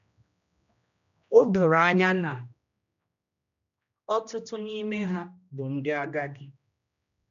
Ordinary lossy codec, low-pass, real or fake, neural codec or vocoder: none; 7.2 kHz; fake; codec, 16 kHz, 1 kbps, X-Codec, HuBERT features, trained on general audio